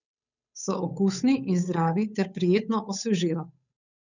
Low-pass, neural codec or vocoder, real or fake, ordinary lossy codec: 7.2 kHz; codec, 16 kHz, 8 kbps, FunCodec, trained on Chinese and English, 25 frames a second; fake; none